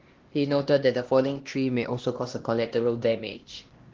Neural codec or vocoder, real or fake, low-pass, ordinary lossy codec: codec, 16 kHz, 1 kbps, X-Codec, HuBERT features, trained on LibriSpeech; fake; 7.2 kHz; Opus, 16 kbps